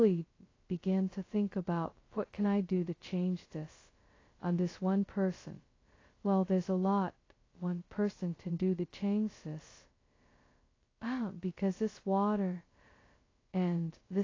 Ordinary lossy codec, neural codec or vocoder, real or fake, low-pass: AAC, 32 kbps; codec, 16 kHz, 0.2 kbps, FocalCodec; fake; 7.2 kHz